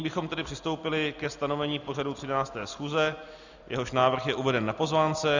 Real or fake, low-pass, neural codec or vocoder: real; 7.2 kHz; none